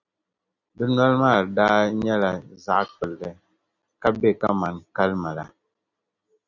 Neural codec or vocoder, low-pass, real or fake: none; 7.2 kHz; real